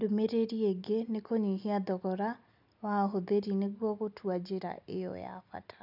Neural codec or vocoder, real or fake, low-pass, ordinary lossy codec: none; real; 5.4 kHz; none